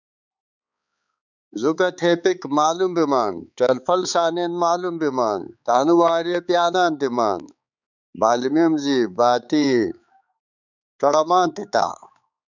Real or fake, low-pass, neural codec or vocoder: fake; 7.2 kHz; codec, 16 kHz, 4 kbps, X-Codec, HuBERT features, trained on balanced general audio